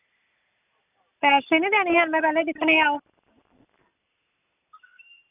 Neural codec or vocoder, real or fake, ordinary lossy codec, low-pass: none; real; none; 3.6 kHz